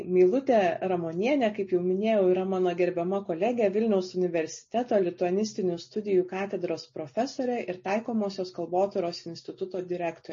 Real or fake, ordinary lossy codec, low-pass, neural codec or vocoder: real; MP3, 32 kbps; 7.2 kHz; none